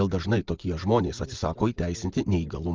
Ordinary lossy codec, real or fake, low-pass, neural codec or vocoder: Opus, 32 kbps; real; 7.2 kHz; none